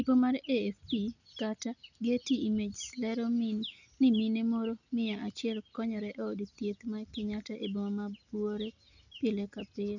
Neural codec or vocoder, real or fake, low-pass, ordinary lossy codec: none; real; 7.2 kHz; none